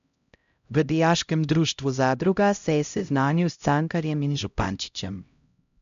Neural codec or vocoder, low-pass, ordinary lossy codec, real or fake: codec, 16 kHz, 0.5 kbps, X-Codec, HuBERT features, trained on LibriSpeech; 7.2 kHz; MP3, 64 kbps; fake